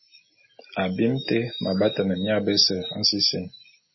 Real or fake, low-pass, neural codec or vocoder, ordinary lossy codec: real; 7.2 kHz; none; MP3, 24 kbps